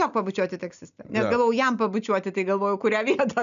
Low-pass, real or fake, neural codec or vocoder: 7.2 kHz; real; none